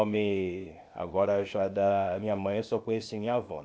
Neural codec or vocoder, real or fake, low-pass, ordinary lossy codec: codec, 16 kHz, 0.8 kbps, ZipCodec; fake; none; none